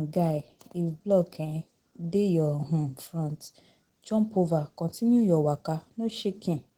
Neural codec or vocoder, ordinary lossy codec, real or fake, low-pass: none; Opus, 16 kbps; real; 19.8 kHz